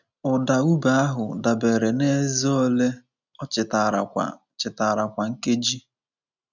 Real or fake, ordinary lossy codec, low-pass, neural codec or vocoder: real; none; 7.2 kHz; none